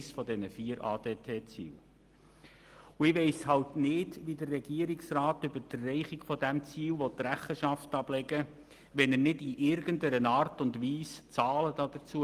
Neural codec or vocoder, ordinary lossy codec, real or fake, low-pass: none; Opus, 24 kbps; real; 14.4 kHz